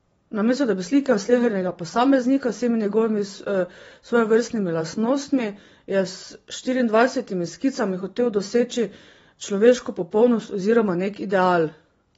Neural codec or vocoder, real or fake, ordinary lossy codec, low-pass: vocoder, 24 kHz, 100 mel bands, Vocos; fake; AAC, 24 kbps; 10.8 kHz